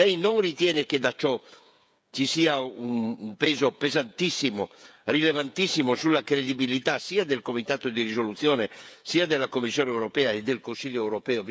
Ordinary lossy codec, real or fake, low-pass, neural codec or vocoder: none; fake; none; codec, 16 kHz, 8 kbps, FreqCodec, smaller model